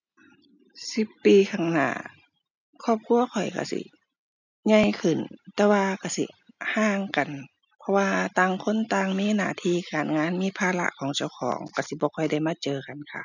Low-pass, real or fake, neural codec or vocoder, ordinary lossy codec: 7.2 kHz; real; none; none